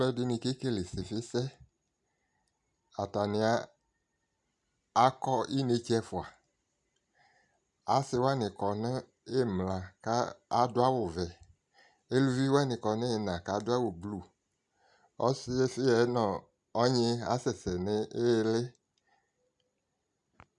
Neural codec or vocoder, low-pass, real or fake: none; 10.8 kHz; real